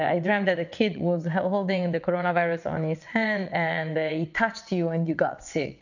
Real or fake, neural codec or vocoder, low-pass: fake; vocoder, 22.05 kHz, 80 mel bands, WaveNeXt; 7.2 kHz